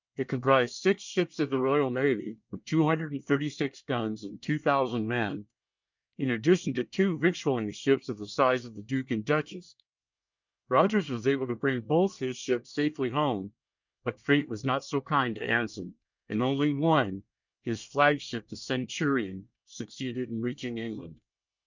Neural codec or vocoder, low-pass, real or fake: codec, 24 kHz, 1 kbps, SNAC; 7.2 kHz; fake